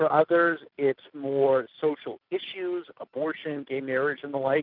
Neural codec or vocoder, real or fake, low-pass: none; real; 5.4 kHz